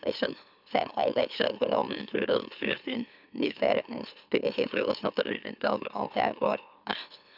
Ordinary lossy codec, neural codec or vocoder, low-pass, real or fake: none; autoencoder, 44.1 kHz, a latent of 192 numbers a frame, MeloTTS; 5.4 kHz; fake